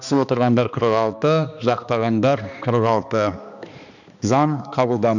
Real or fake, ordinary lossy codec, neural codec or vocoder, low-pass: fake; none; codec, 16 kHz, 2 kbps, X-Codec, HuBERT features, trained on balanced general audio; 7.2 kHz